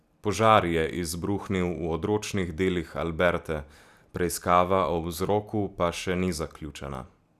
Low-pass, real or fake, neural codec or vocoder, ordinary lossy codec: 14.4 kHz; real; none; none